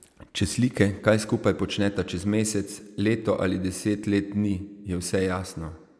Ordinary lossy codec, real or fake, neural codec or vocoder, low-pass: none; real; none; none